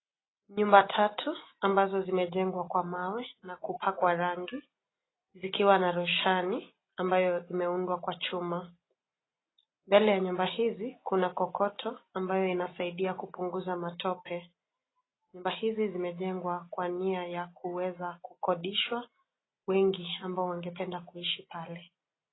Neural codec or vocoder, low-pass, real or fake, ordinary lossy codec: none; 7.2 kHz; real; AAC, 16 kbps